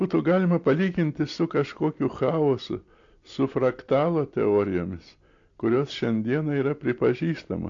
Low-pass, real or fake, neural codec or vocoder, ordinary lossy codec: 7.2 kHz; real; none; AAC, 48 kbps